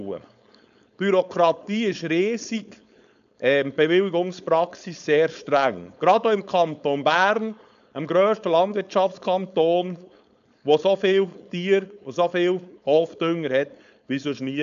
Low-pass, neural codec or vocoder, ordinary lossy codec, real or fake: 7.2 kHz; codec, 16 kHz, 4.8 kbps, FACodec; none; fake